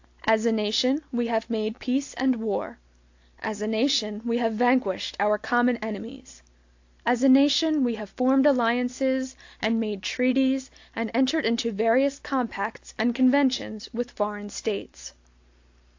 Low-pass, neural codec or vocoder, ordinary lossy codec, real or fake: 7.2 kHz; none; AAC, 48 kbps; real